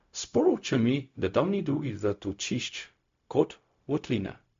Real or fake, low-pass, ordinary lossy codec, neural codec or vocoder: fake; 7.2 kHz; MP3, 48 kbps; codec, 16 kHz, 0.4 kbps, LongCat-Audio-Codec